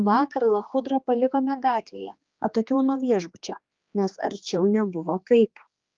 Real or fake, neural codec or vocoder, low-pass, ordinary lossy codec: fake; codec, 16 kHz, 2 kbps, X-Codec, HuBERT features, trained on general audio; 7.2 kHz; Opus, 32 kbps